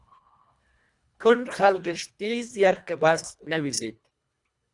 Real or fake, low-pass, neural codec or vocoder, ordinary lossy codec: fake; 10.8 kHz; codec, 24 kHz, 1.5 kbps, HILCodec; Opus, 64 kbps